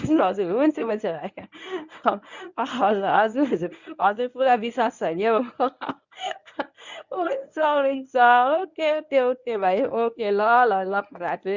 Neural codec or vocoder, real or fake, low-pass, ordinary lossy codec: codec, 24 kHz, 0.9 kbps, WavTokenizer, medium speech release version 1; fake; 7.2 kHz; none